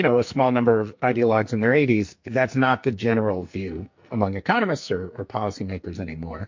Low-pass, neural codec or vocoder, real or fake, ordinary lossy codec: 7.2 kHz; codec, 44.1 kHz, 2.6 kbps, SNAC; fake; MP3, 48 kbps